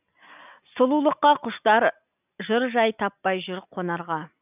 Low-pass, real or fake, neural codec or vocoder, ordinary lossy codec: 3.6 kHz; real; none; none